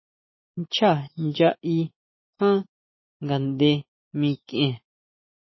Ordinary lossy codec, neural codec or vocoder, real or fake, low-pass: MP3, 24 kbps; none; real; 7.2 kHz